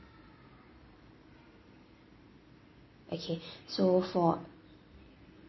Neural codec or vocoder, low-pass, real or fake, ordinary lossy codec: none; 7.2 kHz; real; MP3, 24 kbps